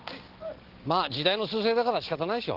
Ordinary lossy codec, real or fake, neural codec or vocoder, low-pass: Opus, 16 kbps; real; none; 5.4 kHz